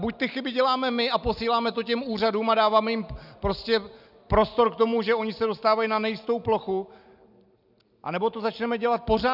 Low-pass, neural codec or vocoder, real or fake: 5.4 kHz; none; real